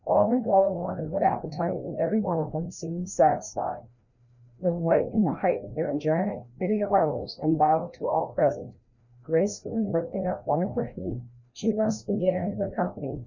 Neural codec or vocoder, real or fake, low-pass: codec, 16 kHz, 1 kbps, FreqCodec, larger model; fake; 7.2 kHz